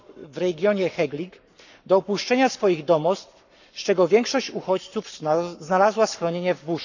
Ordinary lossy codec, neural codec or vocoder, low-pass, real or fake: none; codec, 44.1 kHz, 7.8 kbps, Pupu-Codec; 7.2 kHz; fake